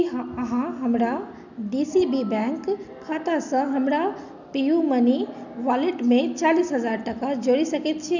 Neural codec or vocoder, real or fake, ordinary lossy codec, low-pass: none; real; none; 7.2 kHz